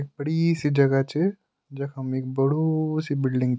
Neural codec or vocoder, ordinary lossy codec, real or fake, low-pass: none; none; real; none